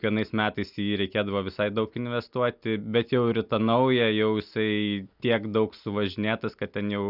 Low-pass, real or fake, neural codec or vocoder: 5.4 kHz; real; none